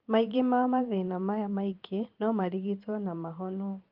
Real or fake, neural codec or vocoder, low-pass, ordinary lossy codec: fake; vocoder, 44.1 kHz, 128 mel bands every 512 samples, BigVGAN v2; 5.4 kHz; Opus, 32 kbps